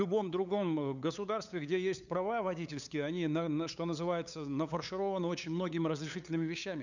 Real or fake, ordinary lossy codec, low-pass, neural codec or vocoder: fake; none; 7.2 kHz; codec, 16 kHz, 8 kbps, FunCodec, trained on LibriTTS, 25 frames a second